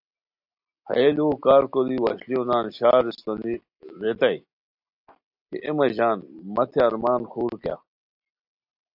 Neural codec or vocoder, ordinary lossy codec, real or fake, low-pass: none; AAC, 48 kbps; real; 5.4 kHz